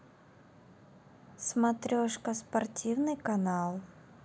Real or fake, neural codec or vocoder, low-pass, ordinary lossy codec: real; none; none; none